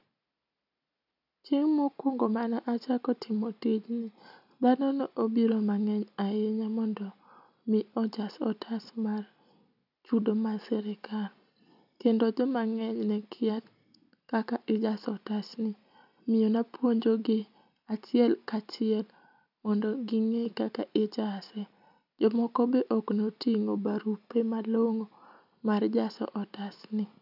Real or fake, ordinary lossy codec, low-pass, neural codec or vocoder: real; none; 5.4 kHz; none